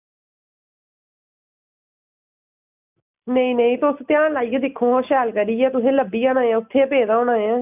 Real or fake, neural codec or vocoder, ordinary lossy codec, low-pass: real; none; none; 3.6 kHz